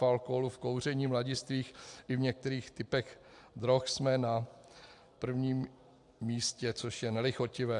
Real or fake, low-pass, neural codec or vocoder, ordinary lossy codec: real; 10.8 kHz; none; AAC, 64 kbps